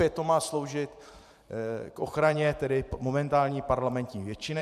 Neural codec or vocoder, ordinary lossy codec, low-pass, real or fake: vocoder, 44.1 kHz, 128 mel bands every 512 samples, BigVGAN v2; MP3, 96 kbps; 14.4 kHz; fake